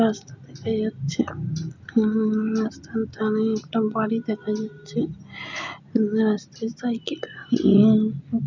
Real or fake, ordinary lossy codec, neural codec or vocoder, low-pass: real; none; none; 7.2 kHz